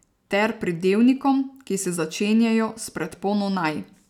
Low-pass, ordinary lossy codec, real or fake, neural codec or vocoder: 19.8 kHz; none; real; none